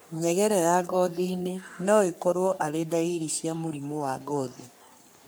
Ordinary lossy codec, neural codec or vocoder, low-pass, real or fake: none; codec, 44.1 kHz, 3.4 kbps, Pupu-Codec; none; fake